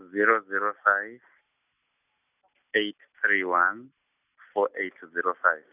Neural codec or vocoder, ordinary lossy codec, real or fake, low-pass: none; none; real; 3.6 kHz